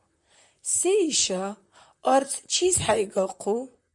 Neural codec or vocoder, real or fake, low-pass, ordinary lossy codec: vocoder, 44.1 kHz, 128 mel bands, Pupu-Vocoder; fake; 10.8 kHz; MP3, 96 kbps